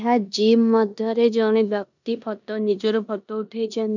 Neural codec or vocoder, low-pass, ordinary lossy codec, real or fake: codec, 16 kHz in and 24 kHz out, 0.9 kbps, LongCat-Audio-Codec, four codebook decoder; 7.2 kHz; none; fake